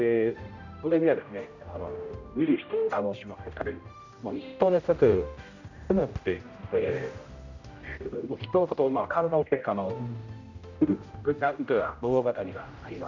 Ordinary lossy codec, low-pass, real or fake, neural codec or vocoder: none; 7.2 kHz; fake; codec, 16 kHz, 0.5 kbps, X-Codec, HuBERT features, trained on general audio